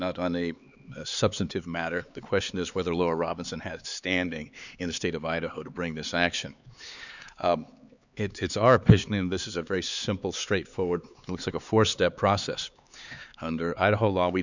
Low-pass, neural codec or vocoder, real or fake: 7.2 kHz; codec, 16 kHz, 4 kbps, X-Codec, HuBERT features, trained on LibriSpeech; fake